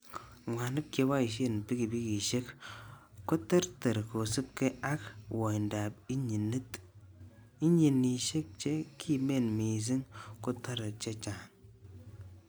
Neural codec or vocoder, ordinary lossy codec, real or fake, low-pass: none; none; real; none